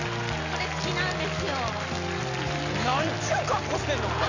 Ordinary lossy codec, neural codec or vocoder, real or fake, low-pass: none; none; real; 7.2 kHz